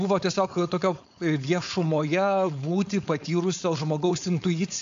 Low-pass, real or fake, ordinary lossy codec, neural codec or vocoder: 7.2 kHz; fake; AAC, 64 kbps; codec, 16 kHz, 4.8 kbps, FACodec